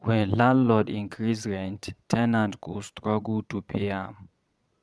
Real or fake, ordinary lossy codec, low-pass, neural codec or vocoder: fake; none; none; vocoder, 22.05 kHz, 80 mel bands, Vocos